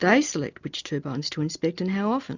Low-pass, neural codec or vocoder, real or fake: 7.2 kHz; none; real